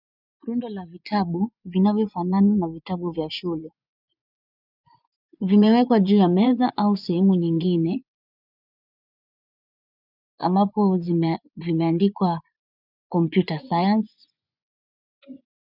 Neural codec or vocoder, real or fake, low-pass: vocoder, 24 kHz, 100 mel bands, Vocos; fake; 5.4 kHz